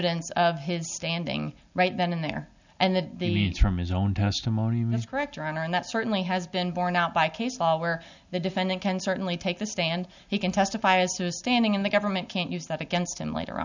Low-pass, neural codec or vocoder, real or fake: 7.2 kHz; none; real